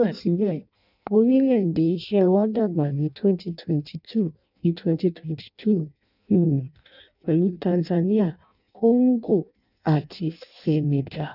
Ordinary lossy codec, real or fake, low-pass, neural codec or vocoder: none; fake; 5.4 kHz; codec, 16 kHz in and 24 kHz out, 0.6 kbps, FireRedTTS-2 codec